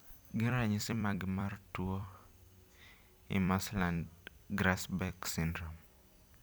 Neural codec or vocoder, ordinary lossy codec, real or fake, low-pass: vocoder, 44.1 kHz, 128 mel bands every 256 samples, BigVGAN v2; none; fake; none